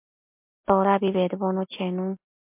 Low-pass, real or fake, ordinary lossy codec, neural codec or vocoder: 3.6 kHz; real; MP3, 32 kbps; none